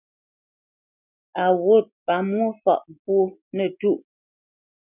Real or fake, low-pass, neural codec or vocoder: real; 3.6 kHz; none